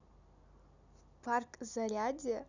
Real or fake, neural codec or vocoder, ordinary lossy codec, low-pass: real; none; none; 7.2 kHz